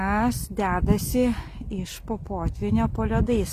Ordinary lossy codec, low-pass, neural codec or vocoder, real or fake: Opus, 64 kbps; 14.4 kHz; none; real